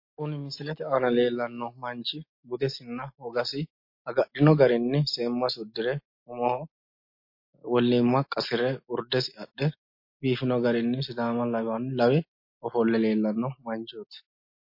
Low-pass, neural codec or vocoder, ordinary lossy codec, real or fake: 5.4 kHz; none; MP3, 32 kbps; real